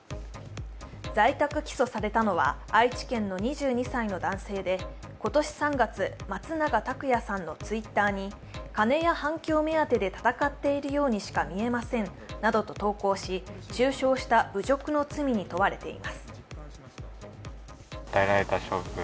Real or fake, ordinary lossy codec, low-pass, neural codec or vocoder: real; none; none; none